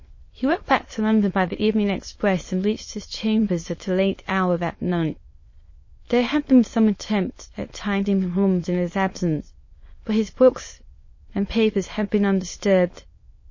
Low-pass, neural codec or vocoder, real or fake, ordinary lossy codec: 7.2 kHz; autoencoder, 22.05 kHz, a latent of 192 numbers a frame, VITS, trained on many speakers; fake; MP3, 32 kbps